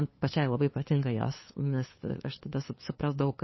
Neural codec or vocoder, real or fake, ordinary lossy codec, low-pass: codec, 16 kHz, 2 kbps, FunCodec, trained on LibriTTS, 25 frames a second; fake; MP3, 24 kbps; 7.2 kHz